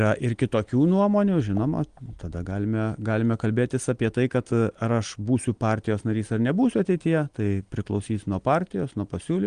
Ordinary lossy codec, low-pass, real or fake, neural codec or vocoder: Opus, 24 kbps; 9.9 kHz; real; none